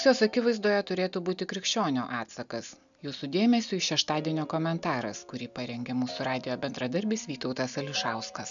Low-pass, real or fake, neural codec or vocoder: 7.2 kHz; real; none